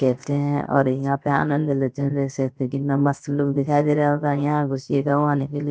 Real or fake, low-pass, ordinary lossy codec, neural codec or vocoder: fake; none; none; codec, 16 kHz, 0.7 kbps, FocalCodec